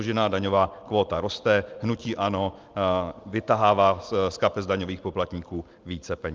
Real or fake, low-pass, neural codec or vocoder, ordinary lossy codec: real; 7.2 kHz; none; Opus, 24 kbps